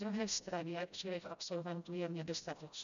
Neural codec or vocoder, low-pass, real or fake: codec, 16 kHz, 0.5 kbps, FreqCodec, smaller model; 7.2 kHz; fake